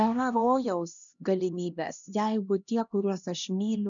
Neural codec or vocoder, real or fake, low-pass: codec, 16 kHz, 1 kbps, X-Codec, HuBERT features, trained on LibriSpeech; fake; 7.2 kHz